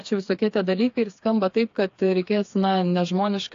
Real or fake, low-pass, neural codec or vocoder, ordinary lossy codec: fake; 7.2 kHz; codec, 16 kHz, 4 kbps, FreqCodec, smaller model; AAC, 48 kbps